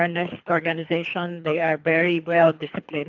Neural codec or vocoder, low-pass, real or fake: codec, 24 kHz, 3 kbps, HILCodec; 7.2 kHz; fake